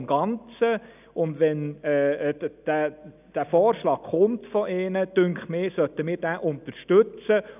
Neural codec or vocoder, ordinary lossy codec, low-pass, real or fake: none; none; 3.6 kHz; real